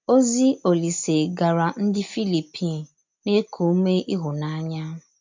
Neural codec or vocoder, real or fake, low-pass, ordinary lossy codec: none; real; 7.2 kHz; MP3, 64 kbps